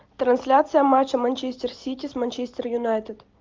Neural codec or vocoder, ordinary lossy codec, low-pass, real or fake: none; Opus, 24 kbps; 7.2 kHz; real